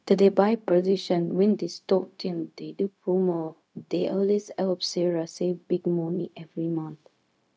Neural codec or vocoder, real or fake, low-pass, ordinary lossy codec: codec, 16 kHz, 0.4 kbps, LongCat-Audio-Codec; fake; none; none